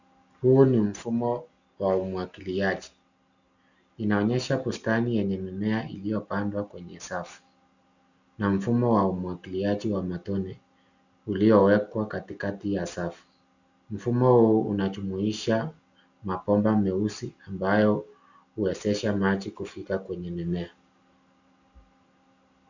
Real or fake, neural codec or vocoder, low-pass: real; none; 7.2 kHz